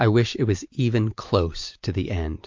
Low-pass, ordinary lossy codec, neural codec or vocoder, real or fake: 7.2 kHz; MP3, 48 kbps; none; real